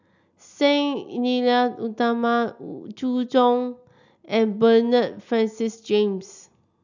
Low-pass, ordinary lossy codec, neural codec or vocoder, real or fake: 7.2 kHz; none; none; real